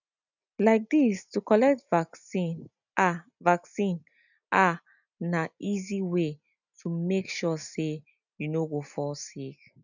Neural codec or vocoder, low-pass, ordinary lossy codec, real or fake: none; 7.2 kHz; none; real